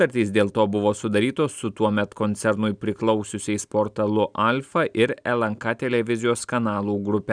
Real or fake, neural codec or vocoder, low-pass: real; none; 9.9 kHz